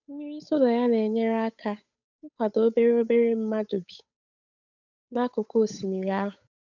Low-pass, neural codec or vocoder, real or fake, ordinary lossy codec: 7.2 kHz; codec, 16 kHz, 8 kbps, FunCodec, trained on Chinese and English, 25 frames a second; fake; none